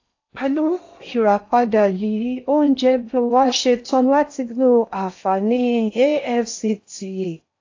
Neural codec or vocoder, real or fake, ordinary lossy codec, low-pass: codec, 16 kHz in and 24 kHz out, 0.6 kbps, FocalCodec, streaming, 4096 codes; fake; AAC, 48 kbps; 7.2 kHz